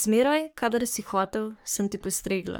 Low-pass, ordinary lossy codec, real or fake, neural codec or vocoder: none; none; fake; codec, 44.1 kHz, 3.4 kbps, Pupu-Codec